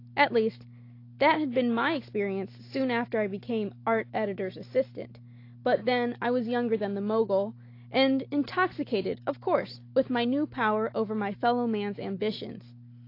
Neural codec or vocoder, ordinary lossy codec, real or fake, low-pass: none; AAC, 32 kbps; real; 5.4 kHz